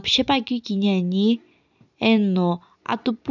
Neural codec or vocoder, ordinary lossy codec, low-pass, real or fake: none; none; 7.2 kHz; real